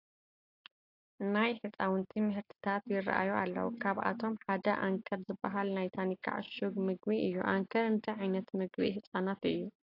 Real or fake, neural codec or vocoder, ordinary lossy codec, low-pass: real; none; AAC, 32 kbps; 5.4 kHz